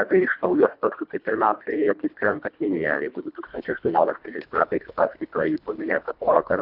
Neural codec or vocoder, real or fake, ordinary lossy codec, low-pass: codec, 24 kHz, 1.5 kbps, HILCodec; fake; Opus, 64 kbps; 5.4 kHz